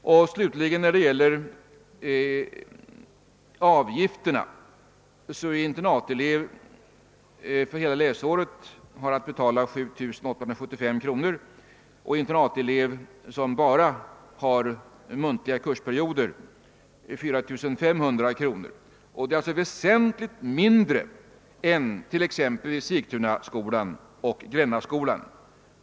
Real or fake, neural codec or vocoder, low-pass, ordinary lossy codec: real; none; none; none